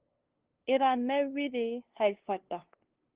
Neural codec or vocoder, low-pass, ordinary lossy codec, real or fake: codec, 16 kHz, 2 kbps, FunCodec, trained on LibriTTS, 25 frames a second; 3.6 kHz; Opus, 16 kbps; fake